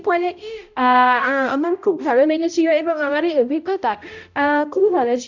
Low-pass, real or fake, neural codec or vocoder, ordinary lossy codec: 7.2 kHz; fake; codec, 16 kHz, 0.5 kbps, X-Codec, HuBERT features, trained on balanced general audio; none